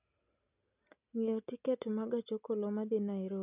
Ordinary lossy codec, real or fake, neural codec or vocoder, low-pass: none; real; none; 3.6 kHz